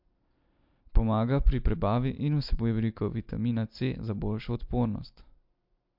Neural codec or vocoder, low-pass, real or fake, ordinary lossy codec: none; 5.4 kHz; real; MP3, 48 kbps